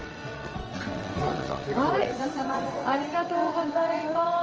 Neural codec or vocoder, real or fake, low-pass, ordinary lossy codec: vocoder, 22.05 kHz, 80 mel bands, WaveNeXt; fake; 7.2 kHz; Opus, 24 kbps